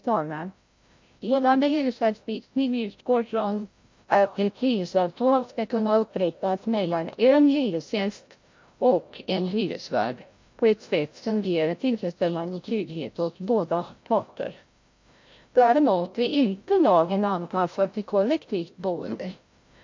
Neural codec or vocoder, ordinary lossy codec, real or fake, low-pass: codec, 16 kHz, 0.5 kbps, FreqCodec, larger model; MP3, 48 kbps; fake; 7.2 kHz